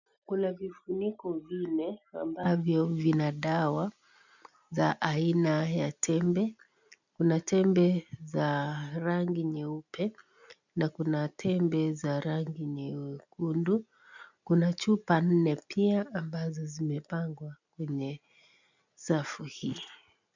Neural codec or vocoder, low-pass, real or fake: none; 7.2 kHz; real